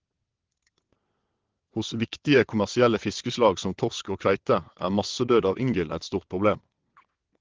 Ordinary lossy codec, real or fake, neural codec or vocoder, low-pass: Opus, 16 kbps; fake; vocoder, 22.05 kHz, 80 mel bands, WaveNeXt; 7.2 kHz